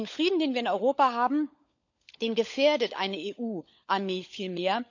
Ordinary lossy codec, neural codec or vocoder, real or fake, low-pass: none; codec, 16 kHz, 16 kbps, FunCodec, trained on LibriTTS, 50 frames a second; fake; 7.2 kHz